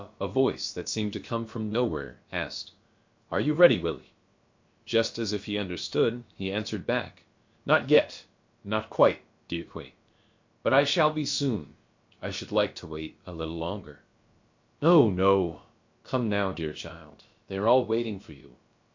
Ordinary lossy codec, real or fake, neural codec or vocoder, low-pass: MP3, 48 kbps; fake; codec, 16 kHz, about 1 kbps, DyCAST, with the encoder's durations; 7.2 kHz